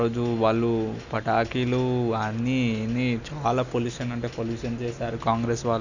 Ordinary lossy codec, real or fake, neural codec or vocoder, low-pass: none; real; none; 7.2 kHz